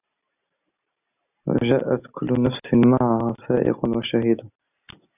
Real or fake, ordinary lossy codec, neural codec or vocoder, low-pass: real; AAC, 32 kbps; none; 3.6 kHz